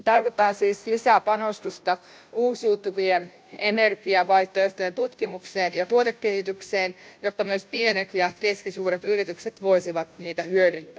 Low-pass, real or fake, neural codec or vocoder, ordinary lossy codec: none; fake; codec, 16 kHz, 0.5 kbps, FunCodec, trained on Chinese and English, 25 frames a second; none